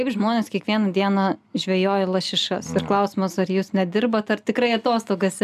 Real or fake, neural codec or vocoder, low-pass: real; none; 14.4 kHz